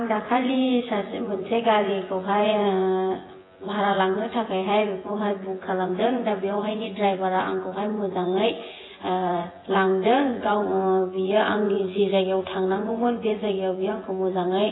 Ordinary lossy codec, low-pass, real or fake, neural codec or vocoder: AAC, 16 kbps; 7.2 kHz; fake; vocoder, 24 kHz, 100 mel bands, Vocos